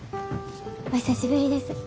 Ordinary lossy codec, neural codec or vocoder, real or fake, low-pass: none; none; real; none